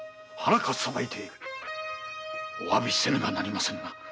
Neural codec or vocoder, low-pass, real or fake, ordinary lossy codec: none; none; real; none